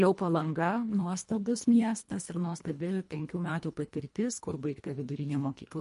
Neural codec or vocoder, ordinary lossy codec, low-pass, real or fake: codec, 24 kHz, 1.5 kbps, HILCodec; MP3, 48 kbps; 10.8 kHz; fake